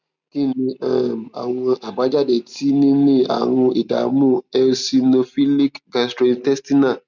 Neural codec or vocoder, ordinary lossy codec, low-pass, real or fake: none; none; 7.2 kHz; real